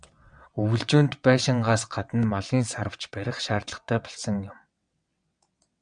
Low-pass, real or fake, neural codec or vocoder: 9.9 kHz; fake; vocoder, 22.05 kHz, 80 mel bands, WaveNeXt